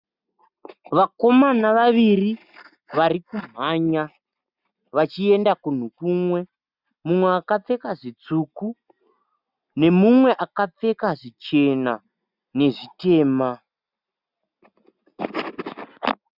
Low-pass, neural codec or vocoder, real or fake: 5.4 kHz; none; real